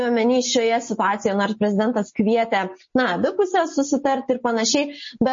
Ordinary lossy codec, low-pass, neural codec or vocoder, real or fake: MP3, 32 kbps; 7.2 kHz; none; real